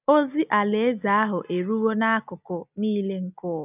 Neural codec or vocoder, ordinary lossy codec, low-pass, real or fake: none; none; 3.6 kHz; real